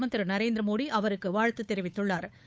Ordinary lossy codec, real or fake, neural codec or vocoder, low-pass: none; fake; codec, 16 kHz, 8 kbps, FunCodec, trained on Chinese and English, 25 frames a second; none